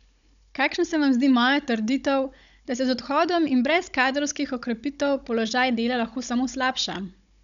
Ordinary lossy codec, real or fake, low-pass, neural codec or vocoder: none; fake; 7.2 kHz; codec, 16 kHz, 16 kbps, FunCodec, trained on Chinese and English, 50 frames a second